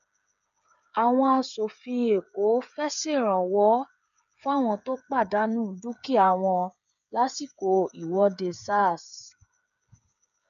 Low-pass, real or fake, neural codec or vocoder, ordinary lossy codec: 7.2 kHz; fake; codec, 16 kHz, 8 kbps, FreqCodec, smaller model; none